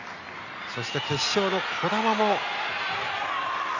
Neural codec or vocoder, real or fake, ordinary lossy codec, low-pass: none; real; none; 7.2 kHz